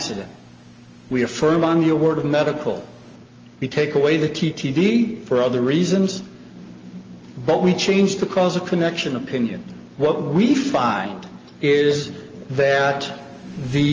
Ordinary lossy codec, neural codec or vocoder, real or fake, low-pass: Opus, 24 kbps; none; real; 7.2 kHz